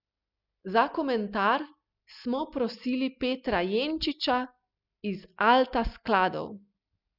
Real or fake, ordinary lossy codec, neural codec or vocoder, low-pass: real; none; none; 5.4 kHz